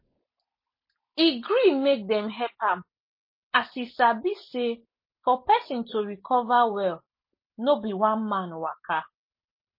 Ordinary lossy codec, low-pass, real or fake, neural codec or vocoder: MP3, 24 kbps; 5.4 kHz; real; none